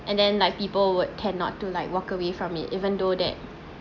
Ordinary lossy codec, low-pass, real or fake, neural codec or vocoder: none; 7.2 kHz; real; none